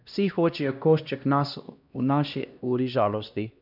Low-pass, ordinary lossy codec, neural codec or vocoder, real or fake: 5.4 kHz; none; codec, 16 kHz, 1 kbps, X-Codec, HuBERT features, trained on LibriSpeech; fake